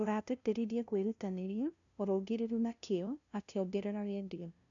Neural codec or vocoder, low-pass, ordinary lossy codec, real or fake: codec, 16 kHz, 0.5 kbps, FunCodec, trained on LibriTTS, 25 frames a second; 7.2 kHz; none; fake